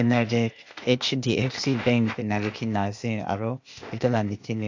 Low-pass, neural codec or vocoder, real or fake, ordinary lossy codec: 7.2 kHz; codec, 16 kHz, 0.8 kbps, ZipCodec; fake; AAC, 48 kbps